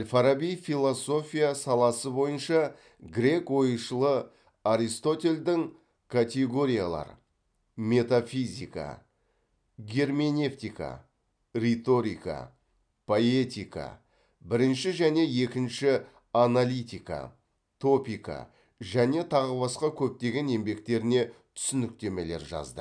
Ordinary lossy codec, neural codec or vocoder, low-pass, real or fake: none; none; 9.9 kHz; real